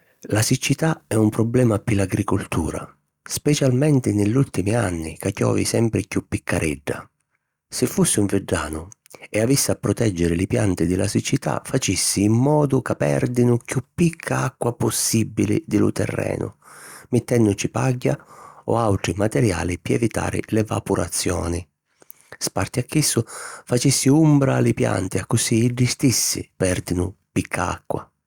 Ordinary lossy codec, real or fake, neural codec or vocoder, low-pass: Opus, 64 kbps; real; none; 19.8 kHz